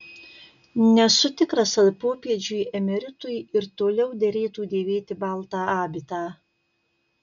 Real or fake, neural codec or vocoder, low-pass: real; none; 7.2 kHz